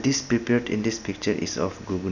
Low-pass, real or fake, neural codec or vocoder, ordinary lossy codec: 7.2 kHz; real; none; none